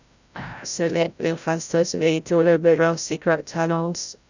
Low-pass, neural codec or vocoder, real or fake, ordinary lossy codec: 7.2 kHz; codec, 16 kHz, 0.5 kbps, FreqCodec, larger model; fake; none